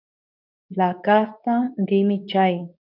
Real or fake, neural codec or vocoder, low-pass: fake; codec, 24 kHz, 0.9 kbps, WavTokenizer, medium speech release version 2; 5.4 kHz